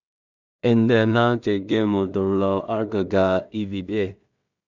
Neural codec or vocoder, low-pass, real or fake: codec, 16 kHz in and 24 kHz out, 0.4 kbps, LongCat-Audio-Codec, two codebook decoder; 7.2 kHz; fake